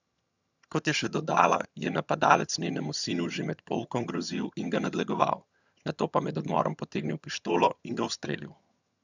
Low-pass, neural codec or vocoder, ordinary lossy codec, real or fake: 7.2 kHz; vocoder, 22.05 kHz, 80 mel bands, HiFi-GAN; none; fake